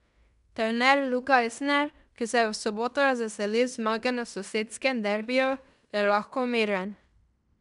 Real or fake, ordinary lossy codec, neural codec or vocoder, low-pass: fake; none; codec, 16 kHz in and 24 kHz out, 0.9 kbps, LongCat-Audio-Codec, fine tuned four codebook decoder; 10.8 kHz